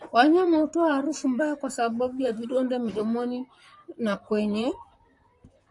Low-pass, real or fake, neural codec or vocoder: 10.8 kHz; fake; vocoder, 44.1 kHz, 128 mel bands, Pupu-Vocoder